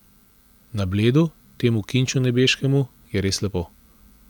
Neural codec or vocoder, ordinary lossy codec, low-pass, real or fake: none; none; 19.8 kHz; real